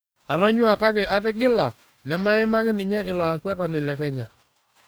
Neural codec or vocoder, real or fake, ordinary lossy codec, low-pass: codec, 44.1 kHz, 2.6 kbps, DAC; fake; none; none